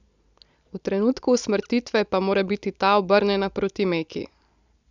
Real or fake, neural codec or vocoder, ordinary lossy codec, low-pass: real; none; Opus, 64 kbps; 7.2 kHz